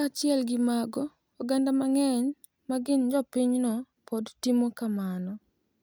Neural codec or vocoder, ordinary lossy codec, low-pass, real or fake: none; none; none; real